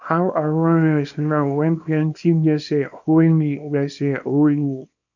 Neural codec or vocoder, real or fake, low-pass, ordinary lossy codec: codec, 24 kHz, 0.9 kbps, WavTokenizer, small release; fake; 7.2 kHz; none